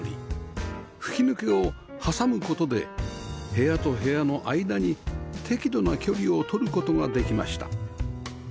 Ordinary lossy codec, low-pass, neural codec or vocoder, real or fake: none; none; none; real